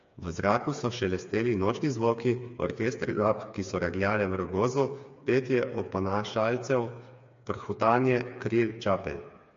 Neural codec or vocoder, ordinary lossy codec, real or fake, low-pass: codec, 16 kHz, 4 kbps, FreqCodec, smaller model; MP3, 48 kbps; fake; 7.2 kHz